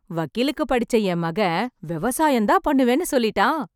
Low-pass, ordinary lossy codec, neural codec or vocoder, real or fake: 19.8 kHz; none; none; real